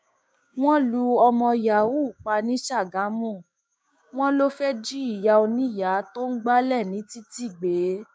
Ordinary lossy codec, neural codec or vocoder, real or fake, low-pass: none; codec, 16 kHz, 6 kbps, DAC; fake; none